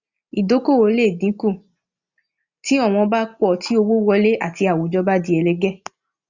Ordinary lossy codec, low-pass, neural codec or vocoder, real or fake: Opus, 64 kbps; 7.2 kHz; none; real